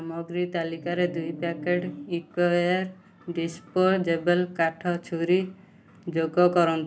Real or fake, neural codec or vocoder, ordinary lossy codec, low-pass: real; none; none; none